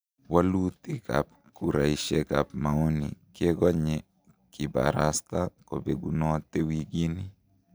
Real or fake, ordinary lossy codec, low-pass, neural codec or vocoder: real; none; none; none